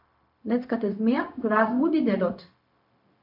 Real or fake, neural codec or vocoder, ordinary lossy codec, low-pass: fake; codec, 16 kHz, 0.4 kbps, LongCat-Audio-Codec; MP3, 48 kbps; 5.4 kHz